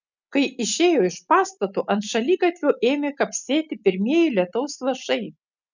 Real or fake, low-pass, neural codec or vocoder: real; 7.2 kHz; none